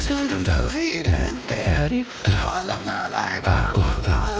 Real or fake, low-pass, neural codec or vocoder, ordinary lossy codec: fake; none; codec, 16 kHz, 1 kbps, X-Codec, WavLM features, trained on Multilingual LibriSpeech; none